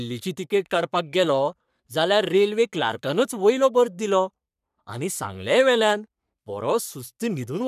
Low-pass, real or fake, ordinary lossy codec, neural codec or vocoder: 14.4 kHz; fake; none; codec, 44.1 kHz, 3.4 kbps, Pupu-Codec